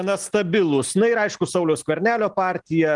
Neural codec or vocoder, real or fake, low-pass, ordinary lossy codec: none; real; 10.8 kHz; Opus, 24 kbps